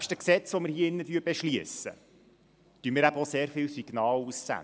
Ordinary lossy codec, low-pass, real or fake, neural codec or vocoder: none; none; real; none